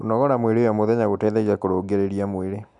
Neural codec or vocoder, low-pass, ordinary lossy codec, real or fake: none; 10.8 kHz; MP3, 96 kbps; real